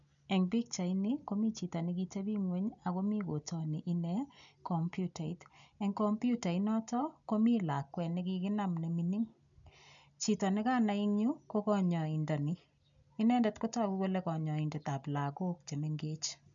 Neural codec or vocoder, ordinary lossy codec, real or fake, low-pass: none; none; real; 7.2 kHz